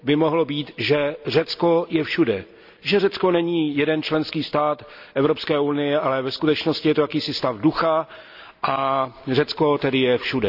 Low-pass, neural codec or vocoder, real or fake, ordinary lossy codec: 5.4 kHz; none; real; none